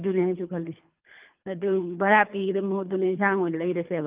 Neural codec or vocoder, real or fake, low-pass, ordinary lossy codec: codec, 24 kHz, 3 kbps, HILCodec; fake; 3.6 kHz; Opus, 24 kbps